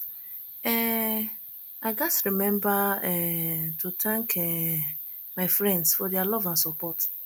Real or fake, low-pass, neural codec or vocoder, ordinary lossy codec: real; none; none; none